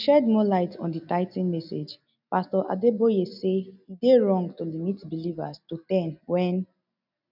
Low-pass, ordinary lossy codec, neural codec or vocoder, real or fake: 5.4 kHz; none; none; real